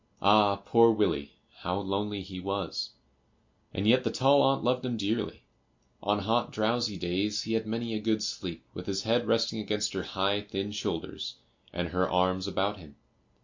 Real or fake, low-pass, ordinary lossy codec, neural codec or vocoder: real; 7.2 kHz; MP3, 48 kbps; none